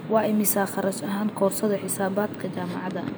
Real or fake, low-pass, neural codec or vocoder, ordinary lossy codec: fake; none; vocoder, 44.1 kHz, 128 mel bands every 512 samples, BigVGAN v2; none